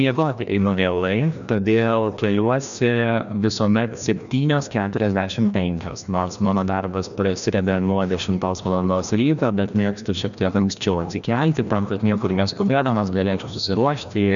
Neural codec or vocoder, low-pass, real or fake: codec, 16 kHz, 1 kbps, FreqCodec, larger model; 7.2 kHz; fake